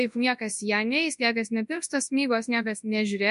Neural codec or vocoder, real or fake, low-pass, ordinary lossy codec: codec, 24 kHz, 0.9 kbps, WavTokenizer, large speech release; fake; 10.8 kHz; MP3, 64 kbps